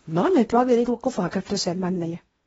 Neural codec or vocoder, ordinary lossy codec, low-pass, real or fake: codec, 16 kHz in and 24 kHz out, 0.8 kbps, FocalCodec, streaming, 65536 codes; AAC, 24 kbps; 10.8 kHz; fake